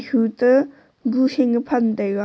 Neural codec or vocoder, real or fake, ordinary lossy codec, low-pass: none; real; none; none